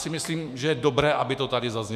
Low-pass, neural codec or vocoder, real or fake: 14.4 kHz; autoencoder, 48 kHz, 128 numbers a frame, DAC-VAE, trained on Japanese speech; fake